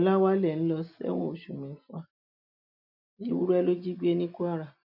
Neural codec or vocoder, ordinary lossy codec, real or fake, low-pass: none; none; real; 5.4 kHz